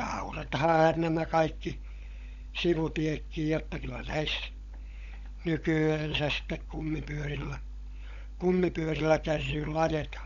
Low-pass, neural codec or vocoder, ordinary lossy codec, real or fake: 7.2 kHz; codec, 16 kHz, 16 kbps, FunCodec, trained on LibriTTS, 50 frames a second; none; fake